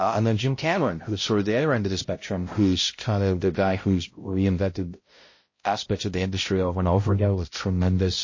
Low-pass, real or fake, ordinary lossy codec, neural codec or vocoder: 7.2 kHz; fake; MP3, 32 kbps; codec, 16 kHz, 0.5 kbps, X-Codec, HuBERT features, trained on balanced general audio